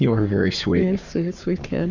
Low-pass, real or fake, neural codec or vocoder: 7.2 kHz; fake; codec, 44.1 kHz, 7.8 kbps, Pupu-Codec